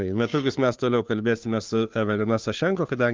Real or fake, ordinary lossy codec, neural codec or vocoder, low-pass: fake; Opus, 24 kbps; codec, 16 kHz, 2 kbps, FunCodec, trained on Chinese and English, 25 frames a second; 7.2 kHz